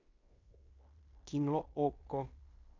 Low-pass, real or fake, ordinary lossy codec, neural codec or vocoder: 7.2 kHz; fake; MP3, 48 kbps; codec, 16 kHz in and 24 kHz out, 0.9 kbps, LongCat-Audio-Codec, fine tuned four codebook decoder